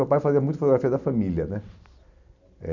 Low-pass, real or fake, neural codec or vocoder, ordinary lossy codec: 7.2 kHz; real; none; none